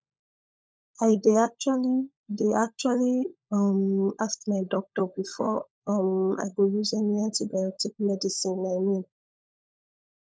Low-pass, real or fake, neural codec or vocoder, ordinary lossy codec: none; fake; codec, 16 kHz, 16 kbps, FunCodec, trained on LibriTTS, 50 frames a second; none